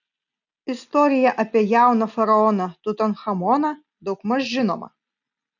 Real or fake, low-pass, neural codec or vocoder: real; 7.2 kHz; none